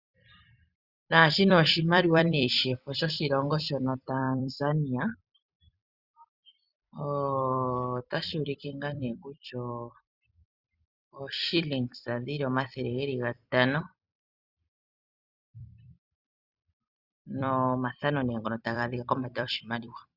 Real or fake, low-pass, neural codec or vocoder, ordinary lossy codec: fake; 5.4 kHz; vocoder, 44.1 kHz, 128 mel bands every 256 samples, BigVGAN v2; Opus, 64 kbps